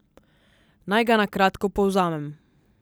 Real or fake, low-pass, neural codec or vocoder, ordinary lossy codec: real; none; none; none